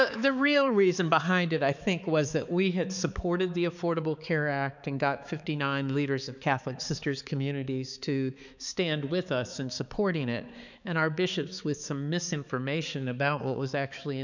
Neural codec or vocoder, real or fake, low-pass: codec, 16 kHz, 4 kbps, X-Codec, HuBERT features, trained on balanced general audio; fake; 7.2 kHz